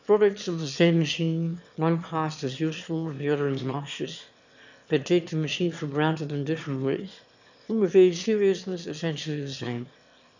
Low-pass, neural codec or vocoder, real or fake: 7.2 kHz; autoencoder, 22.05 kHz, a latent of 192 numbers a frame, VITS, trained on one speaker; fake